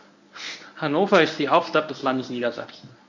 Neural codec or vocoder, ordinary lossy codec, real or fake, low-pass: codec, 24 kHz, 0.9 kbps, WavTokenizer, medium speech release version 1; none; fake; 7.2 kHz